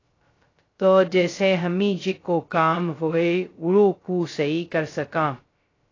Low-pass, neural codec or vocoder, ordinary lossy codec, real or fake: 7.2 kHz; codec, 16 kHz, 0.2 kbps, FocalCodec; AAC, 32 kbps; fake